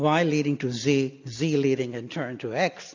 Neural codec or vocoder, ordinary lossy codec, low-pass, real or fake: none; AAC, 48 kbps; 7.2 kHz; real